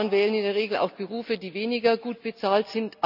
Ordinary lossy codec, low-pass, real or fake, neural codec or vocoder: none; 5.4 kHz; real; none